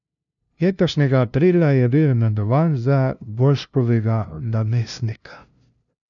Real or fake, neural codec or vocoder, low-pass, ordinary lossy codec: fake; codec, 16 kHz, 0.5 kbps, FunCodec, trained on LibriTTS, 25 frames a second; 7.2 kHz; none